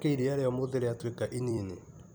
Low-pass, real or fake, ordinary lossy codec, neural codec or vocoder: none; fake; none; vocoder, 44.1 kHz, 128 mel bands every 256 samples, BigVGAN v2